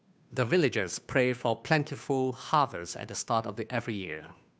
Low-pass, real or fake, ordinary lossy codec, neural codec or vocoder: none; fake; none; codec, 16 kHz, 2 kbps, FunCodec, trained on Chinese and English, 25 frames a second